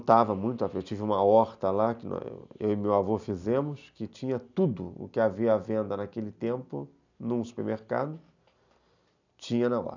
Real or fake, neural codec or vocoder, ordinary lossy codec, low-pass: real; none; none; 7.2 kHz